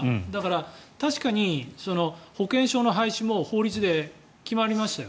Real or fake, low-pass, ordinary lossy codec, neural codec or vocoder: real; none; none; none